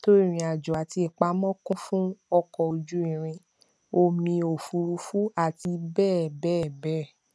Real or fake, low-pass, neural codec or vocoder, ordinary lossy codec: real; none; none; none